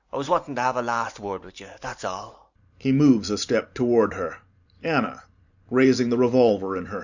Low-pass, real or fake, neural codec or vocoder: 7.2 kHz; fake; vocoder, 44.1 kHz, 128 mel bands every 512 samples, BigVGAN v2